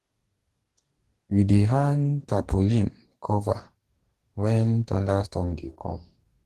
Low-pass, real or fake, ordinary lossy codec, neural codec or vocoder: 14.4 kHz; fake; Opus, 16 kbps; codec, 44.1 kHz, 2.6 kbps, DAC